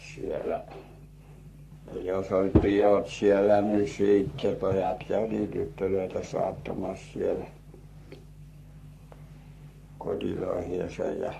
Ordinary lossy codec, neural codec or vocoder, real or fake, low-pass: MP3, 64 kbps; codec, 44.1 kHz, 3.4 kbps, Pupu-Codec; fake; 14.4 kHz